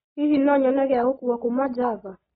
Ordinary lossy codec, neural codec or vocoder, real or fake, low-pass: AAC, 16 kbps; none; real; 14.4 kHz